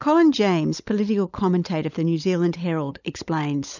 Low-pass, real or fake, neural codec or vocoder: 7.2 kHz; fake; codec, 16 kHz, 16 kbps, FunCodec, trained on LibriTTS, 50 frames a second